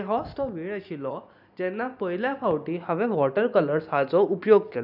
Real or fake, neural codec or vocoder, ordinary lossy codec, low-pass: real; none; none; 5.4 kHz